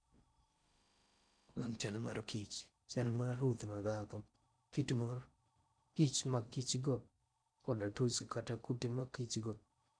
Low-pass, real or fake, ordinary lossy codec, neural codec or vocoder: 9.9 kHz; fake; none; codec, 16 kHz in and 24 kHz out, 0.6 kbps, FocalCodec, streaming, 4096 codes